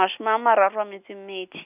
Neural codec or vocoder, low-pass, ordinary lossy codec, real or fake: none; 3.6 kHz; none; real